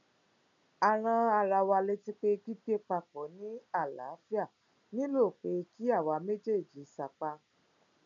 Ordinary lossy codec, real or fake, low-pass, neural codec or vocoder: none; real; 7.2 kHz; none